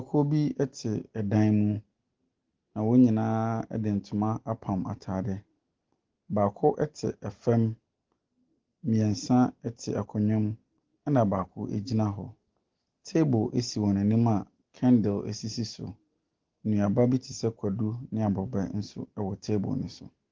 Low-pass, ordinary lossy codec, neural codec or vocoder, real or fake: 7.2 kHz; Opus, 16 kbps; none; real